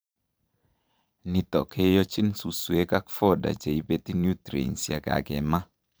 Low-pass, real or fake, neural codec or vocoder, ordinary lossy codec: none; fake; vocoder, 44.1 kHz, 128 mel bands every 512 samples, BigVGAN v2; none